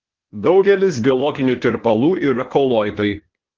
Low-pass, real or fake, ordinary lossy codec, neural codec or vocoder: 7.2 kHz; fake; Opus, 16 kbps; codec, 16 kHz, 0.8 kbps, ZipCodec